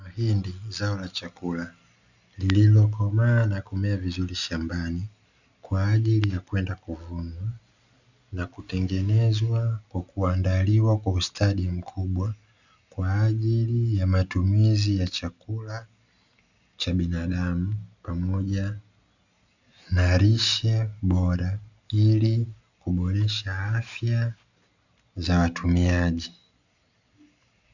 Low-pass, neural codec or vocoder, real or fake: 7.2 kHz; none; real